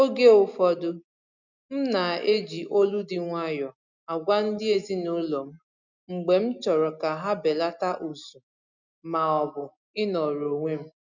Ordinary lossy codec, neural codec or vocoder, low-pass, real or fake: none; none; 7.2 kHz; real